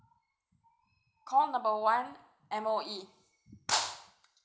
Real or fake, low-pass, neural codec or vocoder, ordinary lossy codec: real; none; none; none